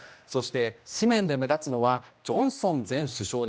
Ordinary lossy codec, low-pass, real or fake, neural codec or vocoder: none; none; fake; codec, 16 kHz, 1 kbps, X-Codec, HuBERT features, trained on general audio